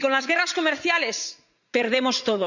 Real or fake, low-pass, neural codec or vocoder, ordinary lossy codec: fake; 7.2 kHz; vocoder, 44.1 kHz, 80 mel bands, Vocos; none